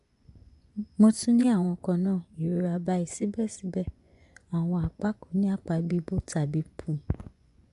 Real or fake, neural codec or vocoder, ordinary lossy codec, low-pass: fake; vocoder, 24 kHz, 100 mel bands, Vocos; none; 10.8 kHz